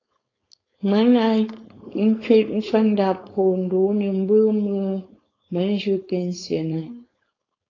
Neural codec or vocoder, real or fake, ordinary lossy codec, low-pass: codec, 16 kHz, 4.8 kbps, FACodec; fake; AAC, 32 kbps; 7.2 kHz